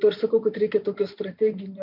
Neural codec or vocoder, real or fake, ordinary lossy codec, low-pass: vocoder, 44.1 kHz, 128 mel bands every 512 samples, BigVGAN v2; fake; MP3, 48 kbps; 5.4 kHz